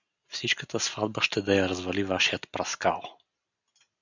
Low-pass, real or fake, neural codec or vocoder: 7.2 kHz; real; none